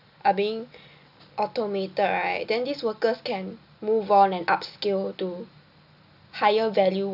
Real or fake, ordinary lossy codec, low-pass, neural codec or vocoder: real; none; 5.4 kHz; none